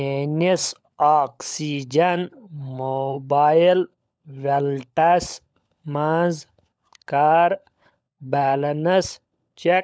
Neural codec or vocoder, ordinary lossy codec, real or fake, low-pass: codec, 16 kHz, 16 kbps, FunCodec, trained on LibriTTS, 50 frames a second; none; fake; none